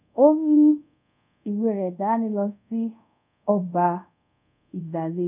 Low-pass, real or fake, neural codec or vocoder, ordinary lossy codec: 3.6 kHz; fake; codec, 24 kHz, 0.5 kbps, DualCodec; none